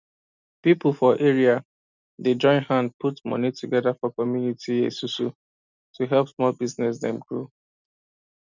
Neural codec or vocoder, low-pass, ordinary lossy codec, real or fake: none; 7.2 kHz; none; real